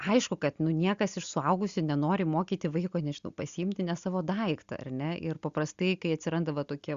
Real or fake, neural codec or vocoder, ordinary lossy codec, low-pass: real; none; Opus, 64 kbps; 7.2 kHz